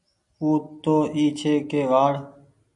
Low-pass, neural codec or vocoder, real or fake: 10.8 kHz; none; real